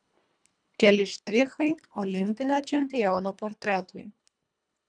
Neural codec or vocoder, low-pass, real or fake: codec, 24 kHz, 1.5 kbps, HILCodec; 9.9 kHz; fake